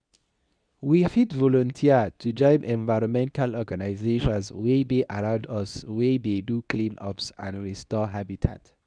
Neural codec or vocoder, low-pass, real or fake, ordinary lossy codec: codec, 24 kHz, 0.9 kbps, WavTokenizer, medium speech release version 2; 9.9 kHz; fake; none